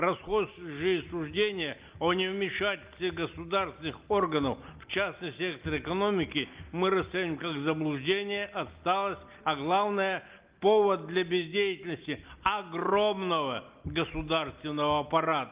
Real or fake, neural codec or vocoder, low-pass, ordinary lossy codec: real; none; 3.6 kHz; Opus, 32 kbps